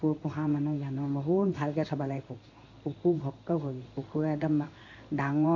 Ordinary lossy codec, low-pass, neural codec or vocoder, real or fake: AAC, 48 kbps; 7.2 kHz; codec, 16 kHz in and 24 kHz out, 1 kbps, XY-Tokenizer; fake